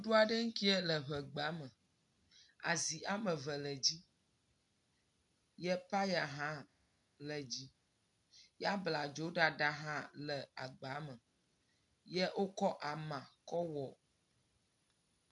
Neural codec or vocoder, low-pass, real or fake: none; 10.8 kHz; real